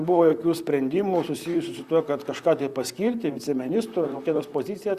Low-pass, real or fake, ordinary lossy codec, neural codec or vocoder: 14.4 kHz; fake; Opus, 64 kbps; vocoder, 44.1 kHz, 128 mel bands, Pupu-Vocoder